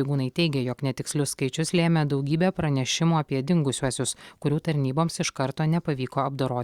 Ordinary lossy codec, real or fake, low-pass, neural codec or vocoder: Opus, 64 kbps; real; 19.8 kHz; none